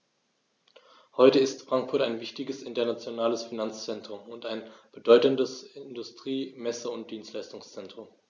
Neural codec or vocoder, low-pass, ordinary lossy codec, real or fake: none; 7.2 kHz; none; real